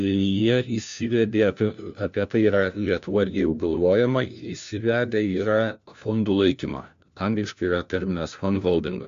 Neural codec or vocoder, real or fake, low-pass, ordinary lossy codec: codec, 16 kHz, 1 kbps, FunCodec, trained on LibriTTS, 50 frames a second; fake; 7.2 kHz; AAC, 64 kbps